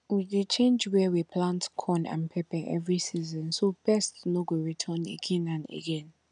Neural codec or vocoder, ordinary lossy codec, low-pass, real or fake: none; none; 9.9 kHz; real